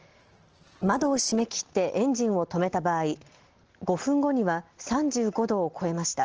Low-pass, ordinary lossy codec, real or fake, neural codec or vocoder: 7.2 kHz; Opus, 16 kbps; real; none